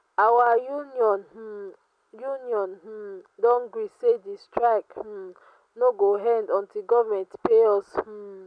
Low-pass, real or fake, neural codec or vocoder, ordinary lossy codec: 9.9 kHz; real; none; none